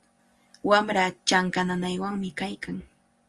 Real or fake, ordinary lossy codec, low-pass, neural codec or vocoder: real; Opus, 32 kbps; 10.8 kHz; none